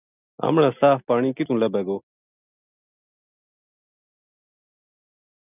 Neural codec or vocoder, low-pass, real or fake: none; 3.6 kHz; real